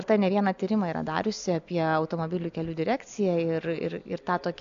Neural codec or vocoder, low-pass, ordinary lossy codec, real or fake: none; 7.2 kHz; AAC, 64 kbps; real